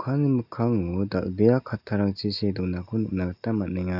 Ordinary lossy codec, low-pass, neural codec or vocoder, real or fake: none; 5.4 kHz; none; real